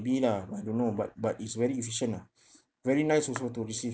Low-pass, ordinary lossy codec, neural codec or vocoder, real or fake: none; none; none; real